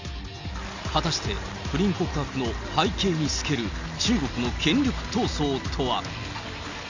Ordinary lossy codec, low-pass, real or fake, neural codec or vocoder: Opus, 64 kbps; 7.2 kHz; real; none